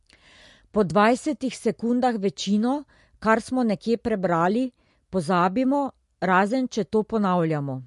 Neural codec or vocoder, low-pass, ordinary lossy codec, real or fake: none; 14.4 kHz; MP3, 48 kbps; real